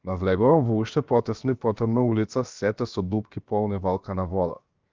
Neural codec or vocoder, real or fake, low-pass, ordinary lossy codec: codec, 16 kHz, 0.7 kbps, FocalCodec; fake; 7.2 kHz; Opus, 24 kbps